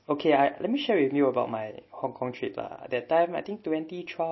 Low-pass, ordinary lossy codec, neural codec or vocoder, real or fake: 7.2 kHz; MP3, 24 kbps; none; real